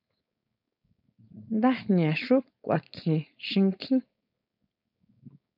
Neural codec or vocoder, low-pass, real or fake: codec, 16 kHz, 4.8 kbps, FACodec; 5.4 kHz; fake